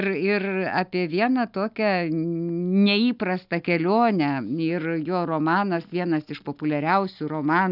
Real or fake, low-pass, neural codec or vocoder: real; 5.4 kHz; none